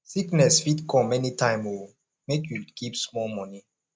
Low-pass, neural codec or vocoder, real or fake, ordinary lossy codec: none; none; real; none